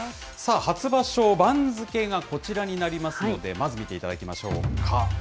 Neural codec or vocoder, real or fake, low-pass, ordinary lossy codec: none; real; none; none